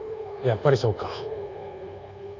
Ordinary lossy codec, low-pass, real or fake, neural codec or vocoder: none; 7.2 kHz; fake; codec, 24 kHz, 1.2 kbps, DualCodec